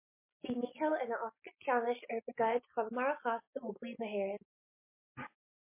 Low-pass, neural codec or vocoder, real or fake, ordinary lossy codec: 3.6 kHz; none; real; MP3, 24 kbps